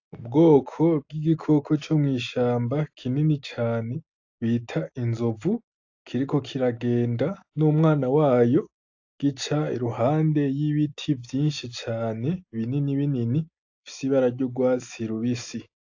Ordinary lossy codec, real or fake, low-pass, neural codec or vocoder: AAC, 48 kbps; real; 7.2 kHz; none